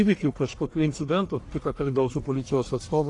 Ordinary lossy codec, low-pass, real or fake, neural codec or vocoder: AAC, 48 kbps; 10.8 kHz; fake; codec, 44.1 kHz, 1.7 kbps, Pupu-Codec